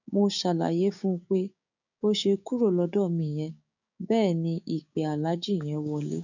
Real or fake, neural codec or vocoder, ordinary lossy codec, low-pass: fake; codec, 16 kHz, 6 kbps, DAC; none; 7.2 kHz